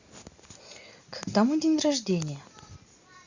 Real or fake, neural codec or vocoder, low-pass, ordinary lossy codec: real; none; 7.2 kHz; Opus, 64 kbps